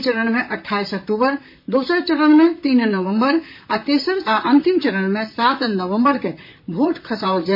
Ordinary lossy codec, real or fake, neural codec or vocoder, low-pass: MP3, 32 kbps; fake; vocoder, 44.1 kHz, 128 mel bands, Pupu-Vocoder; 5.4 kHz